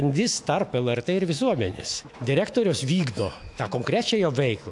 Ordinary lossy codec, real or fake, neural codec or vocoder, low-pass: AAC, 64 kbps; fake; autoencoder, 48 kHz, 128 numbers a frame, DAC-VAE, trained on Japanese speech; 10.8 kHz